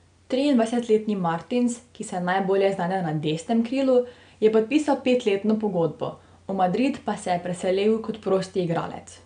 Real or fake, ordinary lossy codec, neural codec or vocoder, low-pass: real; none; none; 9.9 kHz